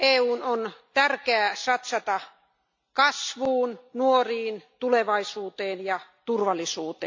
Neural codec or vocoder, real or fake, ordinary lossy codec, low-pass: none; real; MP3, 48 kbps; 7.2 kHz